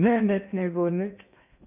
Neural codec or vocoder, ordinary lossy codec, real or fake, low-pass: codec, 16 kHz in and 24 kHz out, 0.6 kbps, FocalCodec, streaming, 4096 codes; none; fake; 3.6 kHz